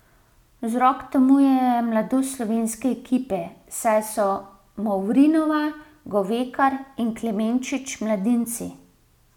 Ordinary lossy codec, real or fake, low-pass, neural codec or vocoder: none; real; 19.8 kHz; none